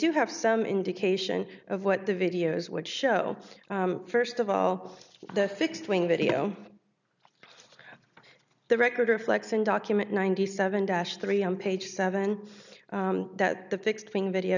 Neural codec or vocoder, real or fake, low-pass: none; real; 7.2 kHz